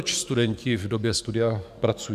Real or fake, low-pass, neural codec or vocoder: fake; 14.4 kHz; codec, 44.1 kHz, 7.8 kbps, DAC